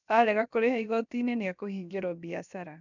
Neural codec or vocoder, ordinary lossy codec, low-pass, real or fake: codec, 16 kHz, about 1 kbps, DyCAST, with the encoder's durations; none; 7.2 kHz; fake